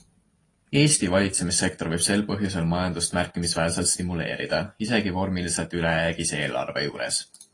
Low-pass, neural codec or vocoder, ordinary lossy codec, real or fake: 10.8 kHz; none; AAC, 32 kbps; real